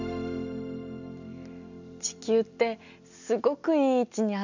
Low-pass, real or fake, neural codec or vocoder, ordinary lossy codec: 7.2 kHz; real; none; none